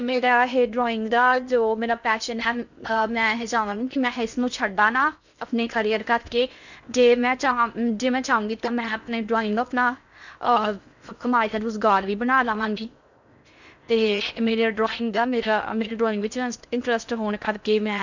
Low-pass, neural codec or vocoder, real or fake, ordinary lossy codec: 7.2 kHz; codec, 16 kHz in and 24 kHz out, 0.6 kbps, FocalCodec, streaming, 2048 codes; fake; none